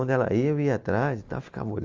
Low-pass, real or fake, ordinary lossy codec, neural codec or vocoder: 7.2 kHz; real; Opus, 32 kbps; none